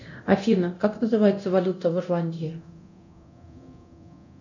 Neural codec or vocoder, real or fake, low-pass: codec, 24 kHz, 0.9 kbps, DualCodec; fake; 7.2 kHz